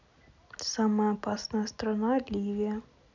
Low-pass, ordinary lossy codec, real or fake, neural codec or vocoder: 7.2 kHz; none; real; none